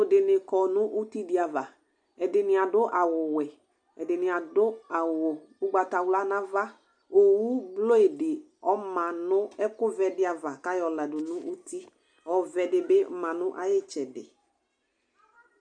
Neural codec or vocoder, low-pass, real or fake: none; 9.9 kHz; real